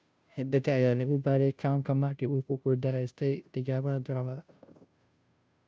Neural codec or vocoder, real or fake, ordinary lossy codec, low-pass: codec, 16 kHz, 0.5 kbps, FunCodec, trained on Chinese and English, 25 frames a second; fake; none; none